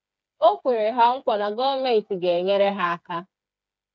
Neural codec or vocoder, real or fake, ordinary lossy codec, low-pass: codec, 16 kHz, 4 kbps, FreqCodec, smaller model; fake; none; none